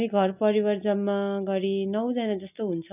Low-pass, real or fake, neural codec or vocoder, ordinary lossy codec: 3.6 kHz; real; none; none